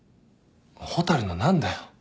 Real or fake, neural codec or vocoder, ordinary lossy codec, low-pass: real; none; none; none